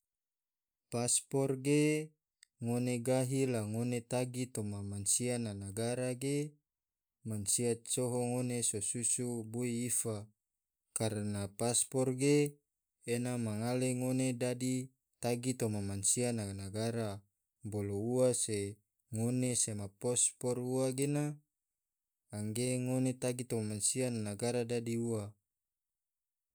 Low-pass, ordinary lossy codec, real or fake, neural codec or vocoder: none; none; real; none